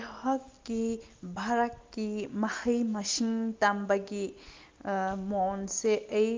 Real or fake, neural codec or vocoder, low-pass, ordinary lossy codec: real; none; 7.2 kHz; Opus, 32 kbps